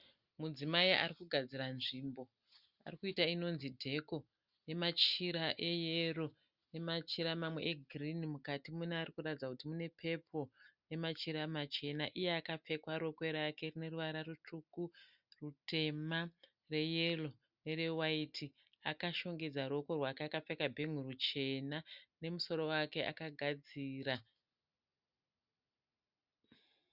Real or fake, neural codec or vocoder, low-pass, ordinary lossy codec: real; none; 5.4 kHz; Opus, 64 kbps